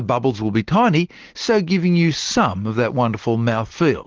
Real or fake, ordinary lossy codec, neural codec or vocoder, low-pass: real; Opus, 16 kbps; none; 7.2 kHz